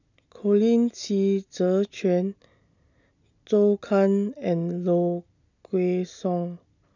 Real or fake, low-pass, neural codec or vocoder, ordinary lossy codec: real; 7.2 kHz; none; none